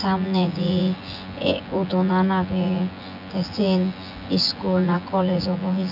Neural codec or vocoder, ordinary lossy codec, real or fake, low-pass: vocoder, 24 kHz, 100 mel bands, Vocos; none; fake; 5.4 kHz